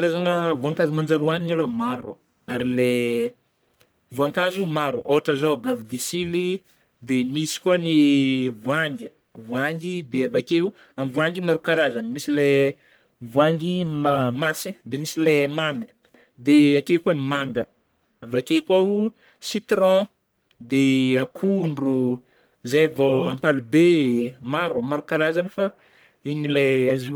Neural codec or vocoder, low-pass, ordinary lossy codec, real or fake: codec, 44.1 kHz, 1.7 kbps, Pupu-Codec; none; none; fake